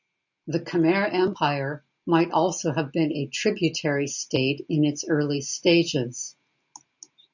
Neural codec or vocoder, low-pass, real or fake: none; 7.2 kHz; real